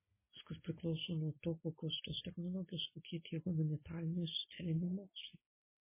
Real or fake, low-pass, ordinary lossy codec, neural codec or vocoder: real; 3.6 kHz; MP3, 16 kbps; none